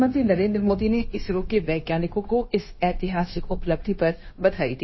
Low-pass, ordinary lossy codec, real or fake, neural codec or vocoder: 7.2 kHz; MP3, 24 kbps; fake; codec, 16 kHz, 0.9 kbps, LongCat-Audio-Codec